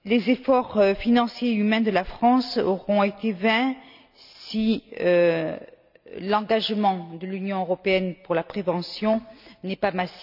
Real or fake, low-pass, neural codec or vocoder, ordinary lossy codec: real; 5.4 kHz; none; none